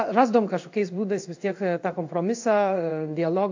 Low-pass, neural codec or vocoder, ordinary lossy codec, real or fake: 7.2 kHz; codec, 16 kHz in and 24 kHz out, 1 kbps, XY-Tokenizer; AAC, 48 kbps; fake